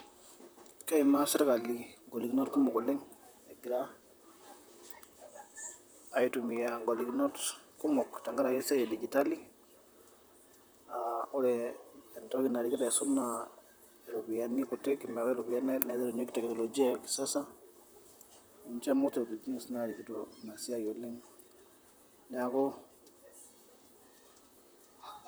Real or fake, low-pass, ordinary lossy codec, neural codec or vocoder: fake; none; none; vocoder, 44.1 kHz, 128 mel bands, Pupu-Vocoder